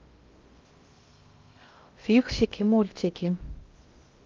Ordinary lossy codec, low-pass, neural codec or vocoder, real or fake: Opus, 24 kbps; 7.2 kHz; codec, 16 kHz in and 24 kHz out, 0.6 kbps, FocalCodec, streaming, 2048 codes; fake